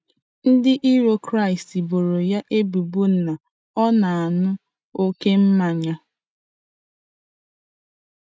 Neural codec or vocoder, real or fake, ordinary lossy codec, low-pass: none; real; none; none